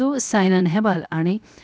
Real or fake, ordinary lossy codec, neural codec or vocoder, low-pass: fake; none; codec, 16 kHz, 0.7 kbps, FocalCodec; none